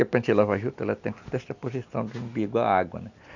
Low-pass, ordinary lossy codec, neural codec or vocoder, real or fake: 7.2 kHz; none; none; real